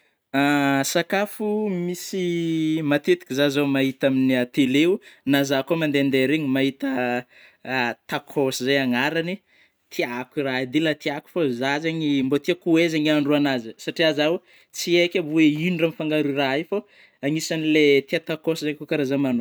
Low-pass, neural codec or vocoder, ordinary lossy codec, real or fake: none; none; none; real